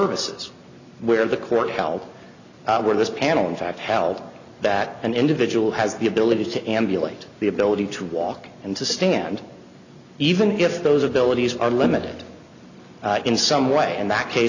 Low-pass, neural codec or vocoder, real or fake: 7.2 kHz; none; real